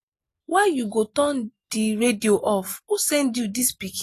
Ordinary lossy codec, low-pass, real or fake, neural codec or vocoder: AAC, 48 kbps; 14.4 kHz; real; none